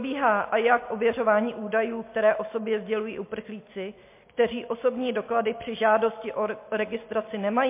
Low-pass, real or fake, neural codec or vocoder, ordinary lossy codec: 3.6 kHz; real; none; MP3, 24 kbps